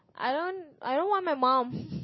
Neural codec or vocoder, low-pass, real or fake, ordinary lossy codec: none; 7.2 kHz; real; MP3, 24 kbps